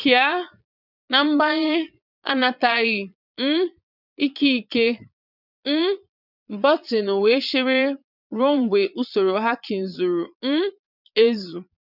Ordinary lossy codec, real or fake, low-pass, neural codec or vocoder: none; fake; 5.4 kHz; vocoder, 22.05 kHz, 80 mel bands, Vocos